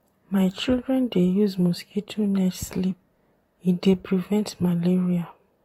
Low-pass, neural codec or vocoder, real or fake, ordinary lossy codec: 19.8 kHz; vocoder, 44.1 kHz, 128 mel bands, Pupu-Vocoder; fake; AAC, 48 kbps